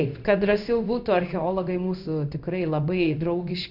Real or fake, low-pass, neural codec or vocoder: fake; 5.4 kHz; codec, 16 kHz in and 24 kHz out, 1 kbps, XY-Tokenizer